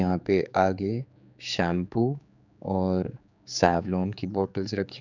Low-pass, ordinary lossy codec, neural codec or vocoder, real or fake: 7.2 kHz; none; codec, 16 kHz, 4 kbps, X-Codec, HuBERT features, trained on general audio; fake